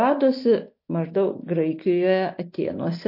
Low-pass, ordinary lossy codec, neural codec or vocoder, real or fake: 5.4 kHz; MP3, 32 kbps; none; real